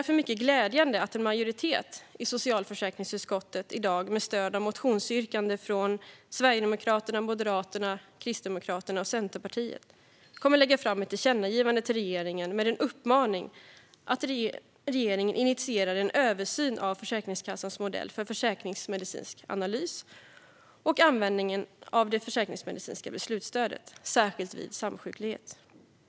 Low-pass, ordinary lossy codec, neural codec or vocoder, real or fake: none; none; none; real